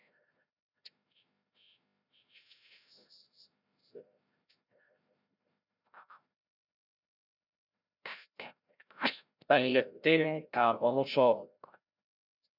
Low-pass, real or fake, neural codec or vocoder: 5.4 kHz; fake; codec, 16 kHz, 0.5 kbps, FreqCodec, larger model